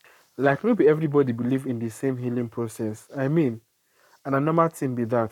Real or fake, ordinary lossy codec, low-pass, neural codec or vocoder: fake; none; 19.8 kHz; codec, 44.1 kHz, 7.8 kbps, Pupu-Codec